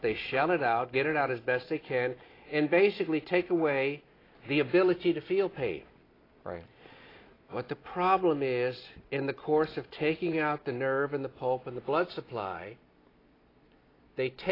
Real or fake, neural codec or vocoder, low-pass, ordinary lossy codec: real; none; 5.4 kHz; AAC, 24 kbps